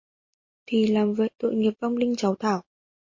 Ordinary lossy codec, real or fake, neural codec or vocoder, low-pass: MP3, 32 kbps; real; none; 7.2 kHz